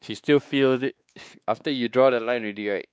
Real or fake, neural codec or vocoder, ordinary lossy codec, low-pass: fake; codec, 16 kHz, 2 kbps, X-Codec, WavLM features, trained on Multilingual LibriSpeech; none; none